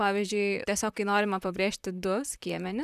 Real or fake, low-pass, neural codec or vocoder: fake; 14.4 kHz; vocoder, 44.1 kHz, 128 mel bands, Pupu-Vocoder